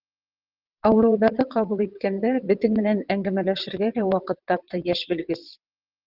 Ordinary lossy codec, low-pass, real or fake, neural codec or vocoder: Opus, 24 kbps; 5.4 kHz; fake; vocoder, 22.05 kHz, 80 mel bands, WaveNeXt